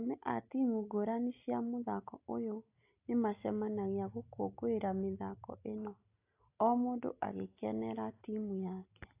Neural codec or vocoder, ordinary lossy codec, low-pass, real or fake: none; AAC, 24 kbps; 3.6 kHz; real